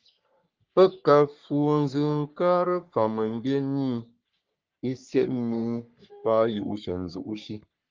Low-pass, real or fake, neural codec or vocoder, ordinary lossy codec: 7.2 kHz; fake; codec, 44.1 kHz, 3.4 kbps, Pupu-Codec; Opus, 24 kbps